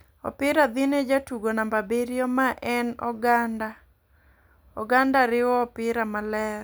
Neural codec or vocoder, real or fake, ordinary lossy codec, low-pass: none; real; none; none